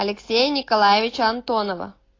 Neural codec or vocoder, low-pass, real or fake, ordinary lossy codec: none; 7.2 kHz; real; AAC, 32 kbps